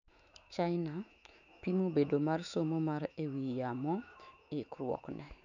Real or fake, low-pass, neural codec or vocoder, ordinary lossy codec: fake; 7.2 kHz; autoencoder, 48 kHz, 128 numbers a frame, DAC-VAE, trained on Japanese speech; none